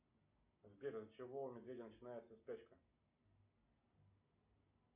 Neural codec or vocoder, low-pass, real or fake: none; 3.6 kHz; real